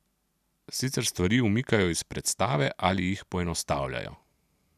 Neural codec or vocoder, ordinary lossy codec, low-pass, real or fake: none; none; 14.4 kHz; real